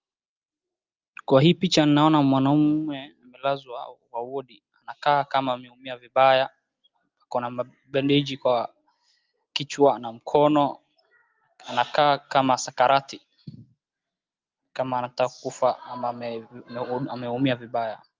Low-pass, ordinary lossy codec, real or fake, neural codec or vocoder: 7.2 kHz; Opus, 32 kbps; real; none